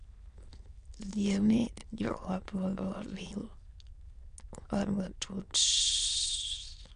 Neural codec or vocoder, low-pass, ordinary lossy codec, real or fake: autoencoder, 22.05 kHz, a latent of 192 numbers a frame, VITS, trained on many speakers; 9.9 kHz; MP3, 96 kbps; fake